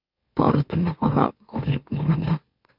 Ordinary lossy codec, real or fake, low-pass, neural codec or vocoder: none; fake; 5.4 kHz; autoencoder, 44.1 kHz, a latent of 192 numbers a frame, MeloTTS